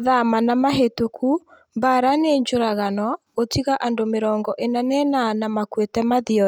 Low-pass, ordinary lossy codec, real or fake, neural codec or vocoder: none; none; real; none